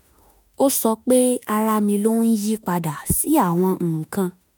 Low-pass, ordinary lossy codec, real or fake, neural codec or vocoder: none; none; fake; autoencoder, 48 kHz, 32 numbers a frame, DAC-VAE, trained on Japanese speech